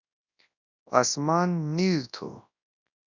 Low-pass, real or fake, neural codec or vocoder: 7.2 kHz; fake; codec, 24 kHz, 0.9 kbps, WavTokenizer, large speech release